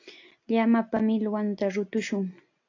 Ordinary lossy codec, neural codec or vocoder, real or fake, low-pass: AAC, 48 kbps; none; real; 7.2 kHz